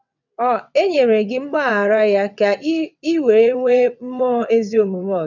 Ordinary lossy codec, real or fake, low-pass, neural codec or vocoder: none; fake; 7.2 kHz; vocoder, 22.05 kHz, 80 mel bands, WaveNeXt